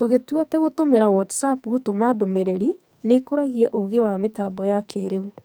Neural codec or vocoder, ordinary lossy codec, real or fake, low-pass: codec, 44.1 kHz, 2.6 kbps, SNAC; none; fake; none